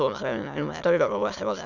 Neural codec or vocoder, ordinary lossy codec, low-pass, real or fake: autoencoder, 22.05 kHz, a latent of 192 numbers a frame, VITS, trained on many speakers; none; 7.2 kHz; fake